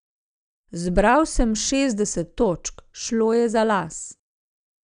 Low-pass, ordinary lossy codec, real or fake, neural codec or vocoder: 9.9 kHz; none; real; none